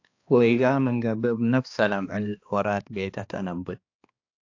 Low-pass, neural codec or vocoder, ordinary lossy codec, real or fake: 7.2 kHz; codec, 16 kHz, 2 kbps, X-Codec, HuBERT features, trained on balanced general audio; AAC, 48 kbps; fake